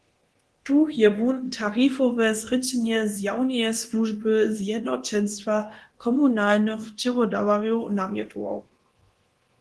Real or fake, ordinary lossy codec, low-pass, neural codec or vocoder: fake; Opus, 16 kbps; 10.8 kHz; codec, 24 kHz, 0.9 kbps, DualCodec